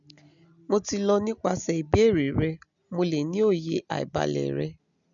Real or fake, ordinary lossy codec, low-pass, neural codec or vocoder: real; none; 7.2 kHz; none